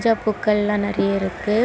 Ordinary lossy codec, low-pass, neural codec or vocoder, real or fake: none; none; none; real